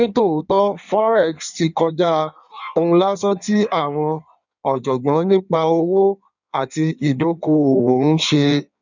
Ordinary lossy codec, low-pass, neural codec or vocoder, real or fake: none; 7.2 kHz; codec, 16 kHz in and 24 kHz out, 1.1 kbps, FireRedTTS-2 codec; fake